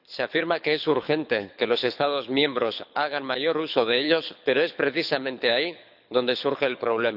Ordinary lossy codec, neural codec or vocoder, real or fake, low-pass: none; codec, 24 kHz, 6 kbps, HILCodec; fake; 5.4 kHz